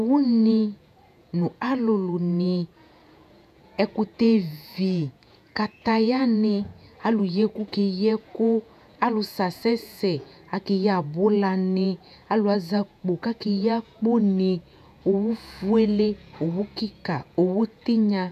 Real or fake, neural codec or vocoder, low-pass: fake; vocoder, 48 kHz, 128 mel bands, Vocos; 14.4 kHz